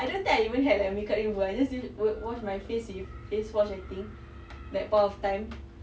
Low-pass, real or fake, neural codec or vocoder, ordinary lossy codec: none; real; none; none